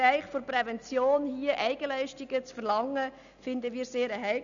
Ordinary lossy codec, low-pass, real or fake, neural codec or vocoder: none; 7.2 kHz; real; none